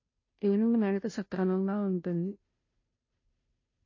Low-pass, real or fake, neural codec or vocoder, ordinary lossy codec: 7.2 kHz; fake; codec, 16 kHz, 0.5 kbps, FunCodec, trained on Chinese and English, 25 frames a second; MP3, 32 kbps